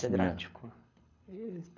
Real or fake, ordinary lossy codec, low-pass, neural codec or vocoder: fake; none; 7.2 kHz; codec, 24 kHz, 6 kbps, HILCodec